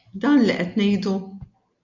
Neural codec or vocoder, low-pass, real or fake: none; 7.2 kHz; real